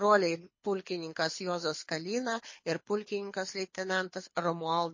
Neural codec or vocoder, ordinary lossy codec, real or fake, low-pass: codec, 24 kHz, 6 kbps, HILCodec; MP3, 32 kbps; fake; 7.2 kHz